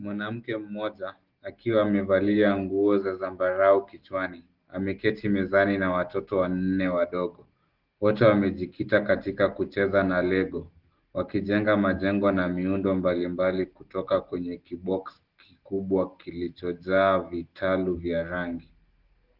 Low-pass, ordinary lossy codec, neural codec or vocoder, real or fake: 5.4 kHz; Opus, 16 kbps; none; real